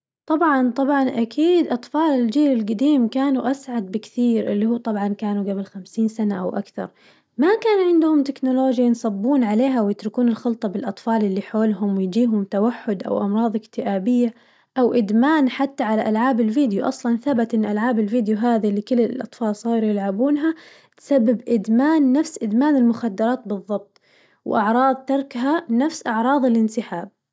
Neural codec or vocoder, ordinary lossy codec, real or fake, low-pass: none; none; real; none